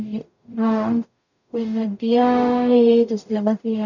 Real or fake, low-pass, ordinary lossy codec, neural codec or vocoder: fake; 7.2 kHz; none; codec, 44.1 kHz, 0.9 kbps, DAC